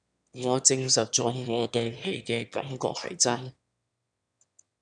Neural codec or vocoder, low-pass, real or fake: autoencoder, 22.05 kHz, a latent of 192 numbers a frame, VITS, trained on one speaker; 9.9 kHz; fake